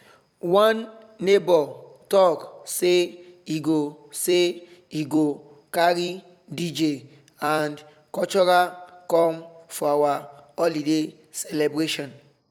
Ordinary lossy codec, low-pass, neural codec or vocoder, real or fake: none; 19.8 kHz; vocoder, 44.1 kHz, 128 mel bands every 256 samples, BigVGAN v2; fake